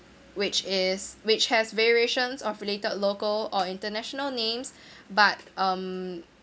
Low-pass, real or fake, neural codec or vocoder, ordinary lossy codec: none; real; none; none